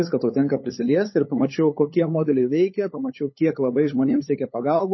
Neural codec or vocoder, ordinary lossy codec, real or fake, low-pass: codec, 16 kHz, 8 kbps, FunCodec, trained on LibriTTS, 25 frames a second; MP3, 24 kbps; fake; 7.2 kHz